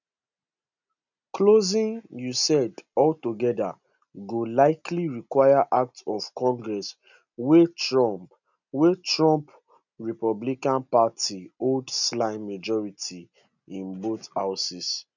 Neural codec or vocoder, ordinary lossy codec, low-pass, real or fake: none; none; 7.2 kHz; real